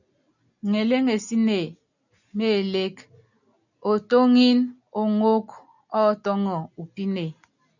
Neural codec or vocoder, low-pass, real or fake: none; 7.2 kHz; real